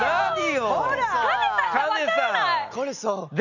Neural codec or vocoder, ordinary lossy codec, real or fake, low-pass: none; none; real; 7.2 kHz